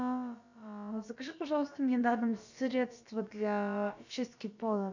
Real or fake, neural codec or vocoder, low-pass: fake; codec, 16 kHz, about 1 kbps, DyCAST, with the encoder's durations; 7.2 kHz